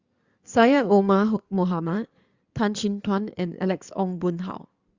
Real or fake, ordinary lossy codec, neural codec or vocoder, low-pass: fake; Opus, 64 kbps; codec, 16 kHz in and 24 kHz out, 2.2 kbps, FireRedTTS-2 codec; 7.2 kHz